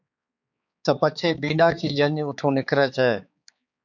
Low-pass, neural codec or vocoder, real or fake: 7.2 kHz; codec, 16 kHz, 4 kbps, X-Codec, HuBERT features, trained on balanced general audio; fake